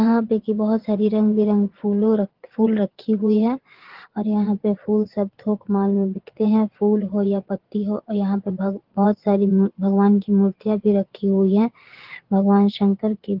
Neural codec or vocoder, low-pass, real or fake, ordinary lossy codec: vocoder, 22.05 kHz, 80 mel bands, Vocos; 5.4 kHz; fake; Opus, 16 kbps